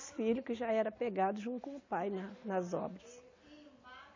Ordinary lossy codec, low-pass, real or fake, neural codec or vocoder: MP3, 48 kbps; 7.2 kHz; real; none